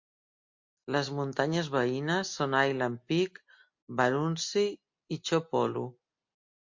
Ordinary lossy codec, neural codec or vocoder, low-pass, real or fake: MP3, 48 kbps; none; 7.2 kHz; real